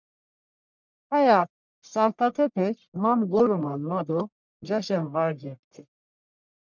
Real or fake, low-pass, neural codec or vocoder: fake; 7.2 kHz; codec, 44.1 kHz, 1.7 kbps, Pupu-Codec